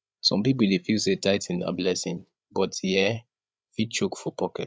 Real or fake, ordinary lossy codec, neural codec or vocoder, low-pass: fake; none; codec, 16 kHz, 8 kbps, FreqCodec, larger model; none